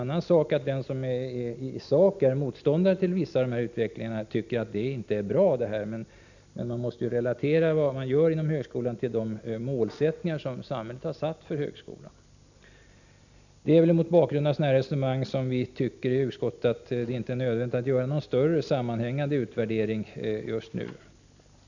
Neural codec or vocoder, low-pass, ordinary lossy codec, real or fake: none; 7.2 kHz; none; real